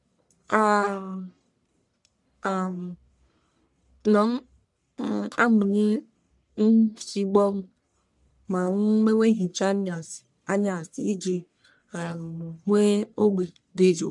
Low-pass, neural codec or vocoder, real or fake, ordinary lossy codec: 10.8 kHz; codec, 44.1 kHz, 1.7 kbps, Pupu-Codec; fake; none